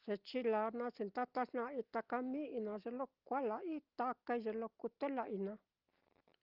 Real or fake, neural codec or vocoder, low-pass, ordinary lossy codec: real; none; 5.4 kHz; Opus, 32 kbps